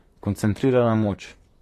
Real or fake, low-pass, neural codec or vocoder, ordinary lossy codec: fake; 14.4 kHz; vocoder, 44.1 kHz, 128 mel bands, Pupu-Vocoder; AAC, 48 kbps